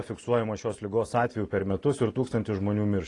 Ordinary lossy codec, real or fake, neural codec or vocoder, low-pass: AAC, 32 kbps; real; none; 10.8 kHz